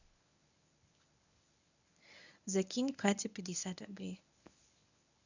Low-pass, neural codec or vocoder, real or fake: 7.2 kHz; codec, 24 kHz, 0.9 kbps, WavTokenizer, medium speech release version 1; fake